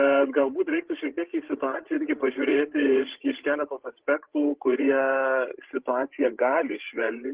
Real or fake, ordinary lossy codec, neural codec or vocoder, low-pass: fake; Opus, 16 kbps; codec, 16 kHz, 16 kbps, FreqCodec, larger model; 3.6 kHz